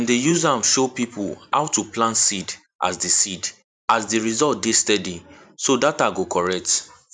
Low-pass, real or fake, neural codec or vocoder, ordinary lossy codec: 9.9 kHz; fake; vocoder, 44.1 kHz, 128 mel bands every 512 samples, BigVGAN v2; none